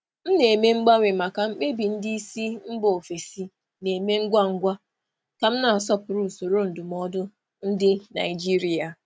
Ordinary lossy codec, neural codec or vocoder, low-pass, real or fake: none; none; none; real